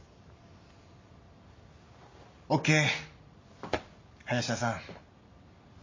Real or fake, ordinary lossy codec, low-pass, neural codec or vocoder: real; MP3, 32 kbps; 7.2 kHz; none